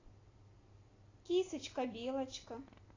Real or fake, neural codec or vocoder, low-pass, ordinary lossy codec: real; none; 7.2 kHz; AAC, 32 kbps